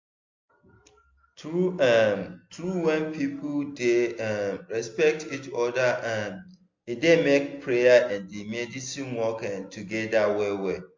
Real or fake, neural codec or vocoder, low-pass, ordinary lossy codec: real; none; 7.2 kHz; MP3, 48 kbps